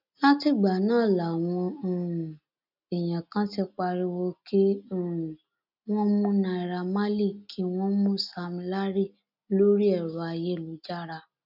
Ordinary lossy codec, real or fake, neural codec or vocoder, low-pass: none; real; none; 5.4 kHz